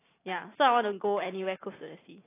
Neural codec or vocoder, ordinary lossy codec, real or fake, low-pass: none; AAC, 16 kbps; real; 3.6 kHz